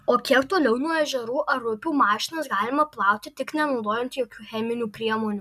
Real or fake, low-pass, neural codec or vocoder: real; 14.4 kHz; none